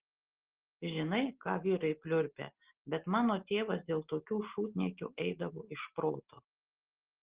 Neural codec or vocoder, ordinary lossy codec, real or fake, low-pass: none; Opus, 16 kbps; real; 3.6 kHz